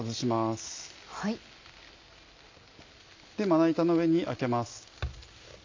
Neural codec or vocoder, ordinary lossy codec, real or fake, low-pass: none; MP3, 64 kbps; real; 7.2 kHz